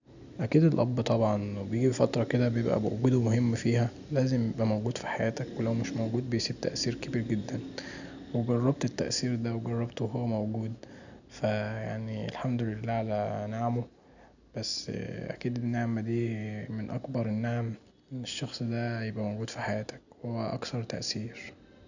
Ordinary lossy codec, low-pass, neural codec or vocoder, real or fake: none; 7.2 kHz; none; real